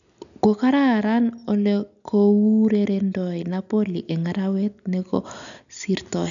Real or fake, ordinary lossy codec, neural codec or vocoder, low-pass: real; none; none; 7.2 kHz